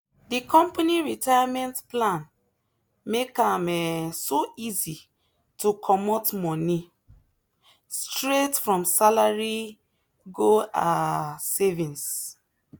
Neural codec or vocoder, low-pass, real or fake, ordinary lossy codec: none; none; real; none